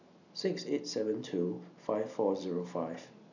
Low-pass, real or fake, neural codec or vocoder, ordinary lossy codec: 7.2 kHz; real; none; none